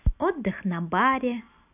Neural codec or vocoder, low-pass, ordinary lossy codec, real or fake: none; 3.6 kHz; none; real